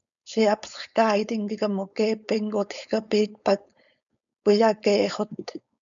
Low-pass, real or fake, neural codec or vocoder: 7.2 kHz; fake; codec, 16 kHz, 4.8 kbps, FACodec